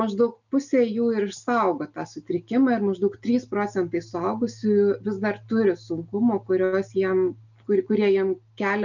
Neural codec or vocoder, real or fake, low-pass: none; real; 7.2 kHz